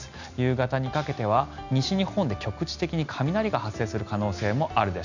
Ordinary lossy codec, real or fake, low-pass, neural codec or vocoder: none; real; 7.2 kHz; none